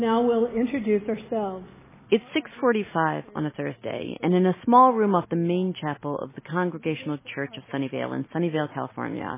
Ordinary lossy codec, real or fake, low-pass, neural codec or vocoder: MP3, 16 kbps; real; 3.6 kHz; none